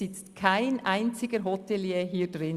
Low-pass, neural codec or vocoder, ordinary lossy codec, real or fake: 14.4 kHz; none; Opus, 64 kbps; real